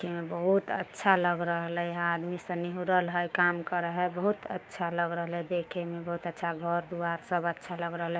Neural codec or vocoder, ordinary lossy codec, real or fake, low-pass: codec, 16 kHz, 6 kbps, DAC; none; fake; none